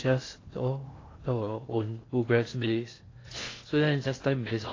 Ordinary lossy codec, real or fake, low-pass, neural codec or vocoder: AAC, 32 kbps; fake; 7.2 kHz; codec, 16 kHz in and 24 kHz out, 0.6 kbps, FocalCodec, streaming, 2048 codes